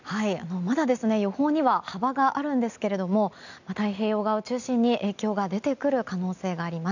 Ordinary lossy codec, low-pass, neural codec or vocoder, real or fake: none; 7.2 kHz; none; real